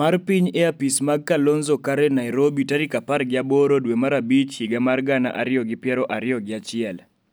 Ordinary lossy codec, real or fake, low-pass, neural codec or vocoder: none; real; none; none